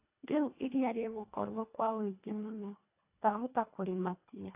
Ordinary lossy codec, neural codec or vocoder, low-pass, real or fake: AAC, 32 kbps; codec, 24 kHz, 1.5 kbps, HILCodec; 3.6 kHz; fake